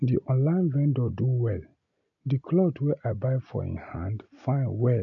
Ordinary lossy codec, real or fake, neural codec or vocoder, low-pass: none; real; none; 7.2 kHz